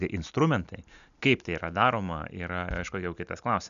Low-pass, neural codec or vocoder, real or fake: 7.2 kHz; none; real